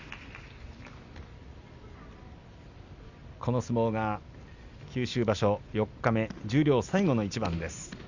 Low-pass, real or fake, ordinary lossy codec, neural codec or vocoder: 7.2 kHz; real; Opus, 64 kbps; none